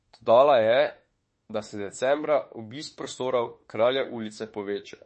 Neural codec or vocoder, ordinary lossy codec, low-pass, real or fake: autoencoder, 48 kHz, 32 numbers a frame, DAC-VAE, trained on Japanese speech; MP3, 32 kbps; 10.8 kHz; fake